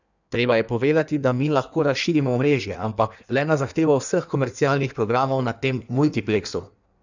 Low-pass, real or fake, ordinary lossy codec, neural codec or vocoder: 7.2 kHz; fake; none; codec, 16 kHz in and 24 kHz out, 1.1 kbps, FireRedTTS-2 codec